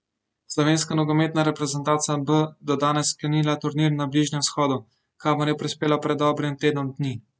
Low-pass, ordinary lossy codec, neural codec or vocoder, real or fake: none; none; none; real